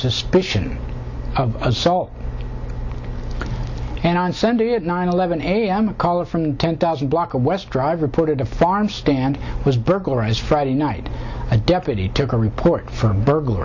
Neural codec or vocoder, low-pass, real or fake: none; 7.2 kHz; real